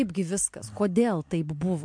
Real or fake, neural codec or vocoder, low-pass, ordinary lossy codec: real; none; 9.9 kHz; MP3, 64 kbps